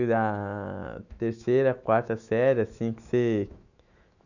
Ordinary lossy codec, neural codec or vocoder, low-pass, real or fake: none; autoencoder, 48 kHz, 128 numbers a frame, DAC-VAE, trained on Japanese speech; 7.2 kHz; fake